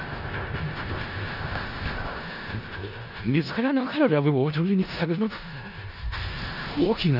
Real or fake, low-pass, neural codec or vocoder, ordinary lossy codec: fake; 5.4 kHz; codec, 16 kHz in and 24 kHz out, 0.4 kbps, LongCat-Audio-Codec, four codebook decoder; none